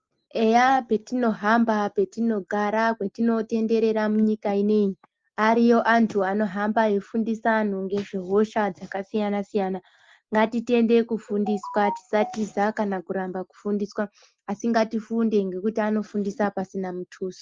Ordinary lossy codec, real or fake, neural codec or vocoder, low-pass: Opus, 32 kbps; real; none; 7.2 kHz